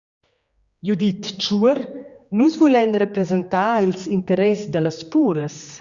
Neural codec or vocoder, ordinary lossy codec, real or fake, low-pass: codec, 16 kHz, 2 kbps, X-Codec, HuBERT features, trained on general audio; Opus, 64 kbps; fake; 7.2 kHz